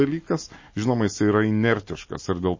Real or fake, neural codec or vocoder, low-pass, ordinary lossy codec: real; none; 7.2 kHz; MP3, 32 kbps